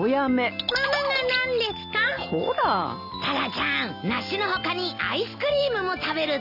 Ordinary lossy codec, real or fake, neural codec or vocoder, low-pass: AAC, 48 kbps; real; none; 5.4 kHz